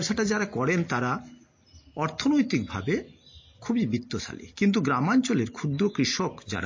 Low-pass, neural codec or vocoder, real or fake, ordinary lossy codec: 7.2 kHz; none; real; MP3, 32 kbps